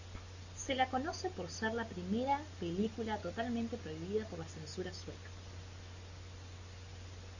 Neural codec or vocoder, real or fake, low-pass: none; real; 7.2 kHz